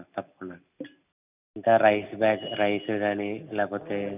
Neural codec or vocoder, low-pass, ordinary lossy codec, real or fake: none; 3.6 kHz; none; real